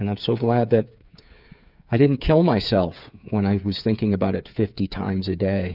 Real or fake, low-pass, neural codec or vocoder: fake; 5.4 kHz; codec, 16 kHz, 8 kbps, FreqCodec, smaller model